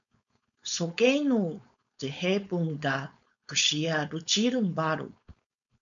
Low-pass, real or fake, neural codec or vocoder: 7.2 kHz; fake; codec, 16 kHz, 4.8 kbps, FACodec